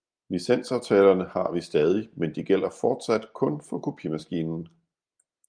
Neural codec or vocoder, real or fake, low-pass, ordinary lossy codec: none; real; 9.9 kHz; Opus, 32 kbps